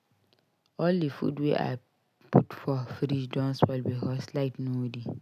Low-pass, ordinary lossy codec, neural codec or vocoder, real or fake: 14.4 kHz; none; none; real